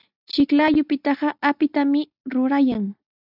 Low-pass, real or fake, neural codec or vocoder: 5.4 kHz; real; none